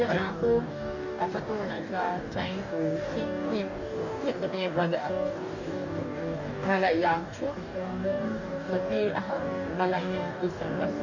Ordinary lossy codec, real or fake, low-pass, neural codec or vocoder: none; fake; 7.2 kHz; codec, 44.1 kHz, 2.6 kbps, DAC